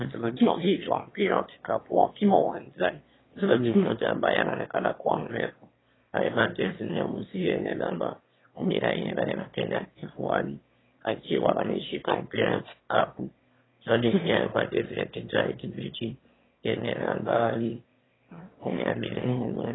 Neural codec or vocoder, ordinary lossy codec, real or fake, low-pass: autoencoder, 22.05 kHz, a latent of 192 numbers a frame, VITS, trained on one speaker; AAC, 16 kbps; fake; 7.2 kHz